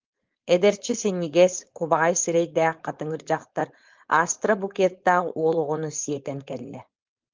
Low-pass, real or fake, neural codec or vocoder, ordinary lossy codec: 7.2 kHz; fake; codec, 16 kHz, 4.8 kbps, FACodec; Opus, 32 kbps